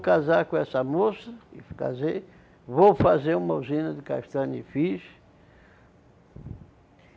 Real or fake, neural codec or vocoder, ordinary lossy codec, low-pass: real; none; none; none